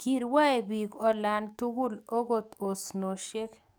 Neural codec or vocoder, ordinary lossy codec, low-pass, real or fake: codec, 44.1 kHz, 7.8 kbps, DAC; none; none; fake